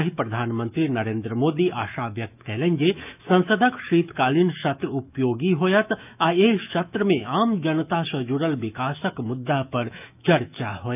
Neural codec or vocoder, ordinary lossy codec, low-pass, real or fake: autoencoder, 48 kHz, 128 numbers a frame, DAC-VAE, trained on Japanese speech; none; 3.6 kHz; fake